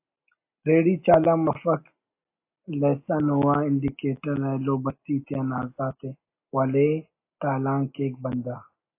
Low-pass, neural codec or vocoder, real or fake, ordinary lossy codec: 3.6 kHz; none; real; AAC, 24 kbps